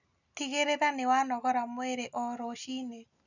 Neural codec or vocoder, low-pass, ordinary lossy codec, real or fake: none; 7.2 kHz; none; real